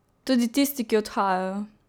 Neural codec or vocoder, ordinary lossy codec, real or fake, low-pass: none; none; real; none